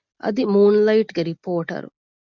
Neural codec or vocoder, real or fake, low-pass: none; real; 7.2 kHz